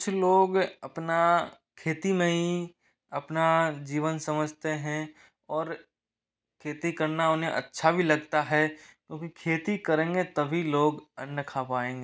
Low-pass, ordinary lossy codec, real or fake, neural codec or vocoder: none; none; real; none